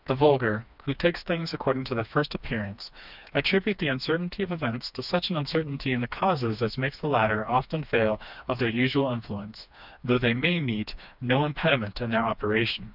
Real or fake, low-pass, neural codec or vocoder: fake; 5.4 kHz; codec, 16 kHz, 2 kbps, FreqCodec, smaller model